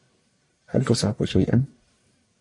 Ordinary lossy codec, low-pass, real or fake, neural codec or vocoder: MP3, 48 kbps; 10.8 kHz; fake; codec, 44.1 kHz, 1.7 kbps, Pupu-Codec